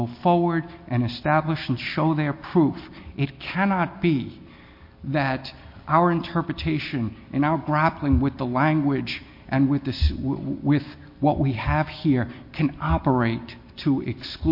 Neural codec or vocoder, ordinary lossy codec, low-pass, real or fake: none; MP3, 32 kbps; 5.4 kHz; real